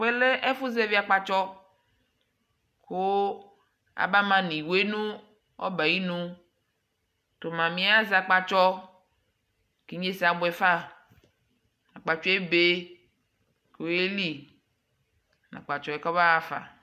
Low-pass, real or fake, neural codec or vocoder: 14.4 kHz; real; none